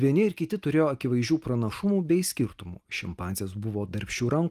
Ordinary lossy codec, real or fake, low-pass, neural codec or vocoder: Opus, 32 kbps; real; 14.4 kHz; none